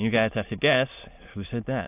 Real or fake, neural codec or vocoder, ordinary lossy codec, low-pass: fake; autoencoder, 22.05 kHz, a latent of 192 numbers a frame, VITS, trained on many speakers; AAC, 32 kbps; 3.6 kHz